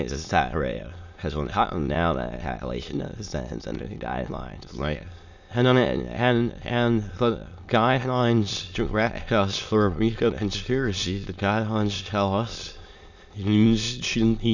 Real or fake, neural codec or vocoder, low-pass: fake; autoencoder, 22.05 kHz, a latent of 192 numbers a frame, VITS, trained on many speakers; 7.2 kHz